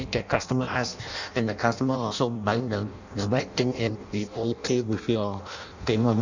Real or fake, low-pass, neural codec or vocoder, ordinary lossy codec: fake; 7.2 kHz; codec, 16 kHz in and 24 kHz out, 0.6 kbps, FireRedTTS-2 codec; none